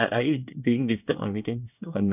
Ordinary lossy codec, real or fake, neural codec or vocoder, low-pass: none; fake; codec, 24 kHz, 1 kbps, SNAC; 3.6 kHz